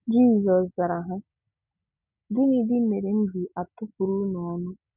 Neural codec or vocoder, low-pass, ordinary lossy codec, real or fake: none; 3.6 kHz; none; real